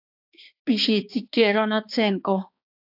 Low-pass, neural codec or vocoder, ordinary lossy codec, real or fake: 5.4 kHz; codec, 16 kHz, 2 kbps, X-Codec, HuBERT features, trained on balanced general audio; AAC, 48 kbps; fake